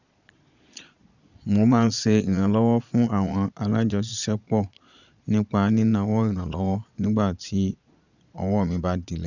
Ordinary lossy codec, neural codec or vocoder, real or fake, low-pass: none; vocoder, 22.05 kHz, 80 mel bands, Vocos; fake; 7.2 kHz